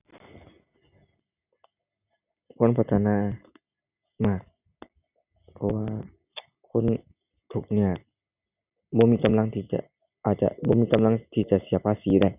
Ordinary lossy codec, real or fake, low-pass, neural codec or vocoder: none; real; 3.6 kHz; none